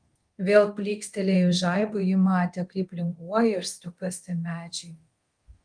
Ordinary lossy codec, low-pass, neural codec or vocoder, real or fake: Opus, 24 kbps; 9.9 kHz; codec, 24 kHz, 0.9 kbps, DualCodec; fake